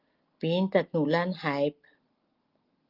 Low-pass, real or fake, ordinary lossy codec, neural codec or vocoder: 5.4 kHz; real; Opus, 32 kbps; none